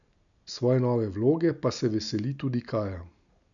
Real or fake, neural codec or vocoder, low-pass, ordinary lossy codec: real; none; 7.2 kHz; none